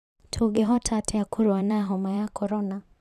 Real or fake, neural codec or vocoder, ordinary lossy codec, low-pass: fake; vocoder, 44.1 kHz, 128 mel bands every 512 samples, BigVGAN v2; none; 14.4 kHz